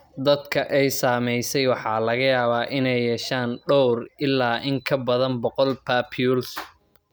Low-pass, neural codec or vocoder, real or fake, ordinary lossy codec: none; none; real; none